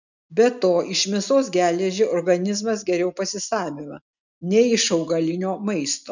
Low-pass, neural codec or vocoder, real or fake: 7.2 kHz; none; real